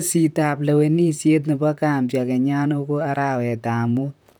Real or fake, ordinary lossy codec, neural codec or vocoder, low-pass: fake; none; vocoder, 44.1 kHz, 128 mel bands, Pupu-Vocoder; none